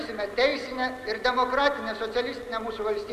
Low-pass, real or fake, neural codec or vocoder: 14.4 kHz; real; none